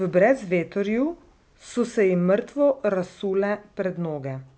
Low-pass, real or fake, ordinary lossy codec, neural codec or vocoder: none; real; none; none